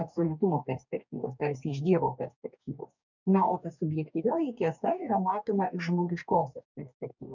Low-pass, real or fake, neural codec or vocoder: 7.2 kHz; fake; codec, 44.1 kHz, 2.6 kbps, DAC